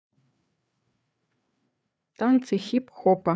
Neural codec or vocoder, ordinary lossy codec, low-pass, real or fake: codec, 16 kHz, 4 kbps, FreqCodec, larger model; none; none; fake